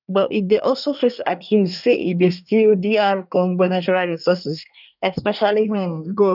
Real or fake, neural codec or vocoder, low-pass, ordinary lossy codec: fake; codec, 24 kHz, 1 kbps, SNAC; 5.4 kHz; none